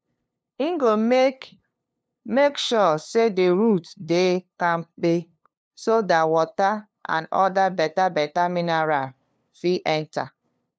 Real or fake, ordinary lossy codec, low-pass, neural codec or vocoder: fake; none; none; codec, 16 kHz, 2 kbps, FunCodec, trained on LibriTTS, 25 frames a second